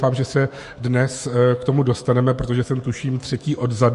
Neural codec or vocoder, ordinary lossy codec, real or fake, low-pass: autoencoder, 48 kHz, 128 numbers a frame, DAC-VAE, trained on Japanese speech; MP3, 48 kbps; fake; 14.4 kHz